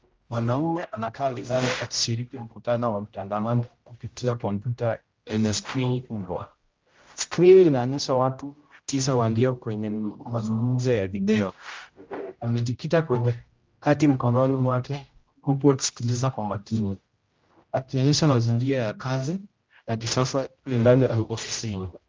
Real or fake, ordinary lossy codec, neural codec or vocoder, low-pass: fake; Opus, 24 kbps; codec, 16 kHz, 0.5 kbps, X-Codec, HuBERT features, trained on general audio; 7.2 kHz